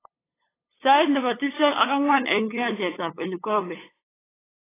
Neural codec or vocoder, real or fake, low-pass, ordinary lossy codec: codec, 16 kHz, 8 kbps, FunCodec, trained on LibriTTS, 25 frames a second; fake; 3.6 kHz; AAC, 16 kbps